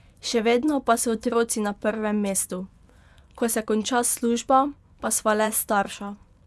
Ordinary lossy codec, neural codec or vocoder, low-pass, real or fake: none; vocoder, 24 kHz, 100 mel bands, Vocos; none; fake